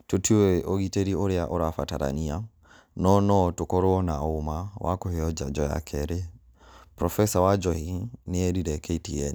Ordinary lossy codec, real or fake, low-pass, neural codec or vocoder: none; real; none; none